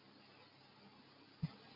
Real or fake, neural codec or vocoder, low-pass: real; none; 5.4 kHz